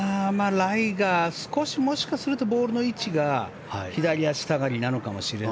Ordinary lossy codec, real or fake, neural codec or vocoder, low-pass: none; real; none; none